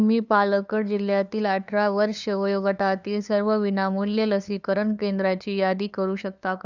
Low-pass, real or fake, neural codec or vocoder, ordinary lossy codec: 7.2 kHz; fake; codec, 16 kHz, 2 kbps, FunCodec, trained on LibriTTS, 25 frames a second; none